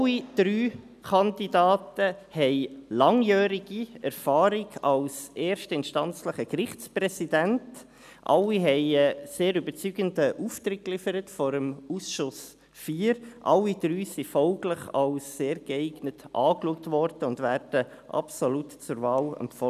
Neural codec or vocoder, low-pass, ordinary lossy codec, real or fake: none; 14.4 kHz; none; real